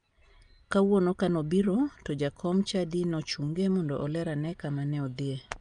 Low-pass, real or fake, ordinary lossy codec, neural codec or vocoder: 9.9 kHz; real; Opus, 32 kbps; none